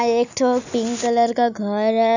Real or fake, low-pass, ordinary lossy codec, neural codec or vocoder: real; 7.2 kHz; none; none